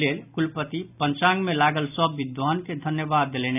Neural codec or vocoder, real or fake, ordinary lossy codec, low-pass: none; real; none; 3.6 kHz